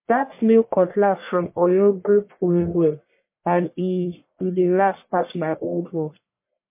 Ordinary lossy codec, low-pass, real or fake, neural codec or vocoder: MP3, 24 kbps; 3.6 kHz; fake; codec, 44.1 kHz, 1.7 kbps, Pupu-Codec